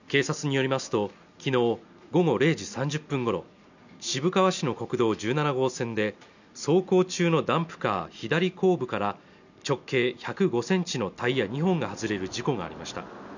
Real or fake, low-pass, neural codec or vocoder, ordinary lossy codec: real; 7.2 kHz; none; none